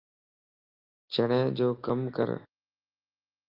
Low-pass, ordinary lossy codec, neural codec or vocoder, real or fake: 5.4 kHz; Opus, 24 kbps; none; real